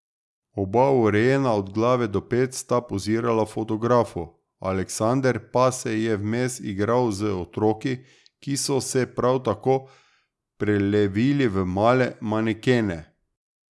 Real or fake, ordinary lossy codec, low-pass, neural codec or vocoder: real; none; none; none